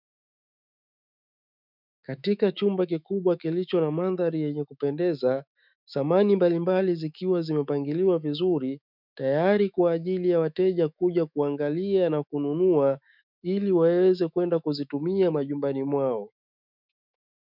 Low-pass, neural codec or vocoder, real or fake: 5.4 kHz; autoencoder, 48 kHz, 128 numbers a frame, DAC-VAE, trained on Japanese speech; fake